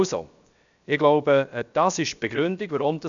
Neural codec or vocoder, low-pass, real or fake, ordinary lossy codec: codec, 16 kHz, 0.7 kbps, FocalCodec; 7.2 kHz; fake; none